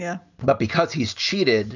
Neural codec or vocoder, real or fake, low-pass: none; real; 7.2 kHz